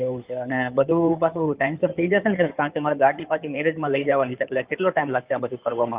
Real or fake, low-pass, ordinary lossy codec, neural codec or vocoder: fake; 3.6 kHz; Opus, 24 kbps; codec, 16 kHz in and 24 kHz out, 2.2 kbps, FireRedTTS-2 codec